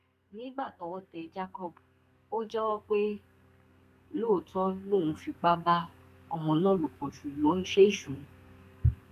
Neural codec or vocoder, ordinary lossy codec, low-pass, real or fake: codec, 32 kHz, 1.9 kbps, SNAC; none; 14.4 kHz; fake